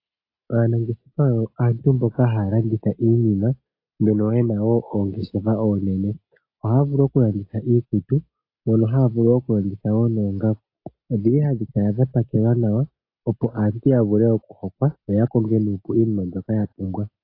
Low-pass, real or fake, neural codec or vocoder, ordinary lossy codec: 5.4 kHz; real; none; AAC, 32 kbps